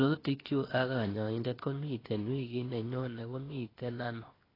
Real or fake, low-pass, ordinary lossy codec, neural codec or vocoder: fake; 5.4 kHz; AAC, 24 kbps; codec, 16 kHz, 0.8 kbps, ZipCodec